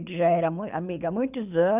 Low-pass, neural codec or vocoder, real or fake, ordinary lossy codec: 3.6 kHz; codec, 24 kHz, 6 kbps, HILCodec; fake; none